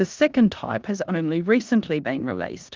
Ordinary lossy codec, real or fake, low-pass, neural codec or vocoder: Opus, 32 kbps; fake; 7.2 kHz; codec, 16 kHz in and 24 kHz out, 0.9 kbps, LongCat-Audio-Codec, four codebook decoder